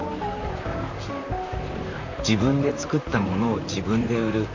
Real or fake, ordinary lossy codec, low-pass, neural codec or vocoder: fake; none; 7.2 kHz; vocoder, 44.1 kHz, 128 mel bands, Pupu-Vocoder